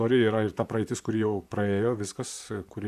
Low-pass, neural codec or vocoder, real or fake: 14.4 kHz; vocoder, 44.1 kHz, 128 mel bands, Pupu-Vocoder; fake